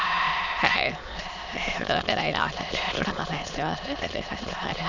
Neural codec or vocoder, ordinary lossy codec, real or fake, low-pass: autoencoder, 22.05 kHz, a latent of 192 numbers a frame, VITS, trained on many speakers; none; fake; 7.2 kHz